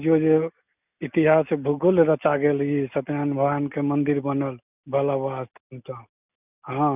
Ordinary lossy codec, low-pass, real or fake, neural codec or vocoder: none; 3.6 kHz; real; none